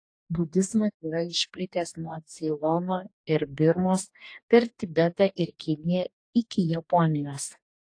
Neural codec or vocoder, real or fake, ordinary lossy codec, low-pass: codec, 44.1 kHz, 2.6 kbps, DAC; fake; AAC, 48 kbps; 9.9 kHz